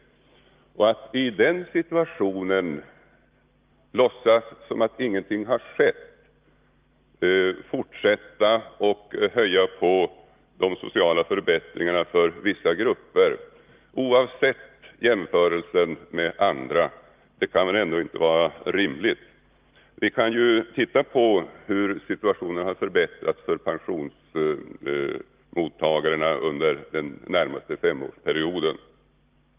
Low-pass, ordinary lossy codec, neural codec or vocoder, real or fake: 3.6 kHz; Opus, 32 kbps; none; real